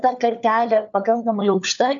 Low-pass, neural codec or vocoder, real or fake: 7.2 kHz; codec, 16 kHz, 4 kbps, FunCodec, trained on LibriTTS, 50 frames a second; fake